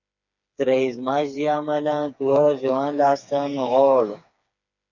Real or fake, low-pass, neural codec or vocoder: fake; 7.2 kHz; codec, 16 kHz, 4 kbps, FreqCodec, smaller model